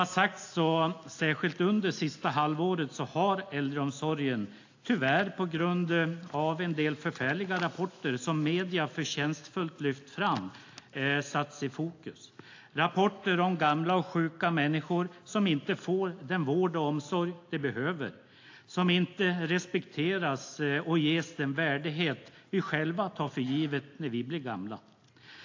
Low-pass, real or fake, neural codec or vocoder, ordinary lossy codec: 7.2 kHz; real; none; AAC, 48 kbps